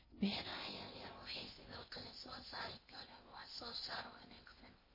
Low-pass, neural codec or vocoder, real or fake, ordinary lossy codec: 5.4 kHz; codec, 16 kHz in and 24 kHz out, 0.8 kbps, FocalCodec, streaming, 65536 codes; fake; MP3, 24 kbps